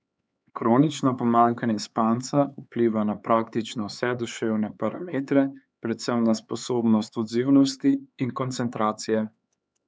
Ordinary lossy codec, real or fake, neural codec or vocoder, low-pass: none; fake; codec, 16 kHz, 4 kbps, X-Codec, HuBERT features, trained on LibriSpeech; none